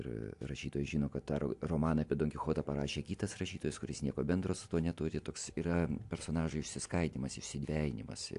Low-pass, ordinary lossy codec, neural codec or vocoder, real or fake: 10.8 kHz; AAC, 64 kbps; none; real